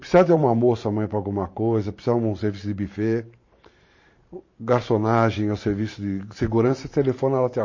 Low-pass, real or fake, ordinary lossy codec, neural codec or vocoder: 7.2 kHz; fake; MP3, 32 kbps; vocoder, 44.1 kHz, 128 mel bands every 512 samples, BigVGAN v2